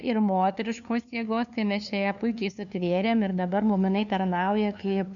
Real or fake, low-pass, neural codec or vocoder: fake; 7.2 kHz; codec, 16 kHz, 2 kbps, FunCodec, trained on LibriTTS, 25 frames a second